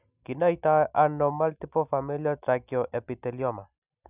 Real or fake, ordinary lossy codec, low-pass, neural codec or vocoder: real; none; 3.6 kHz; none